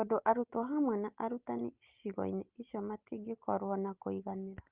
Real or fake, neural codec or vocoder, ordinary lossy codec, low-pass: real; none; Opus, 32 kbps; 3.6 kHz